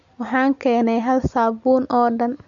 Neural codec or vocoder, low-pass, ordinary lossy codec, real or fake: none; 7.2 kHz; MP3, 48 kbps; real